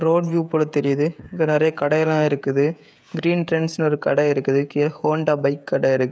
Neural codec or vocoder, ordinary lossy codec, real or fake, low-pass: codec, 16 kHz, 16 kbps, FreqCodec, smaller model; none; fake; none